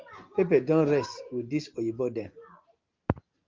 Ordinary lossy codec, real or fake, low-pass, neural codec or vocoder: Opus, 24 kbps; real; 7.2 kHz; none